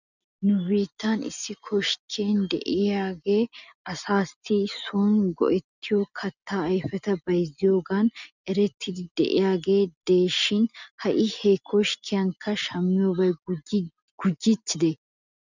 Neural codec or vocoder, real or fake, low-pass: none; real; 7.2 kHz